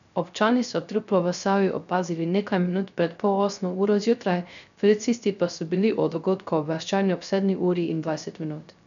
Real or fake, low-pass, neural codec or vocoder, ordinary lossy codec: fake; 7.2 kHz; codec, 16 kHz, 0.3 kbps, FocalCodec; none